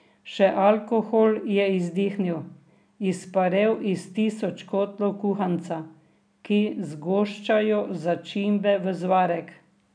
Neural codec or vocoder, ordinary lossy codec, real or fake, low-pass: none; none; real; 9.9 kHz